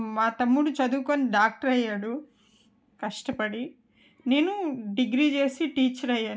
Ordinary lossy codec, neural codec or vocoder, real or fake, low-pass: none; none; real; none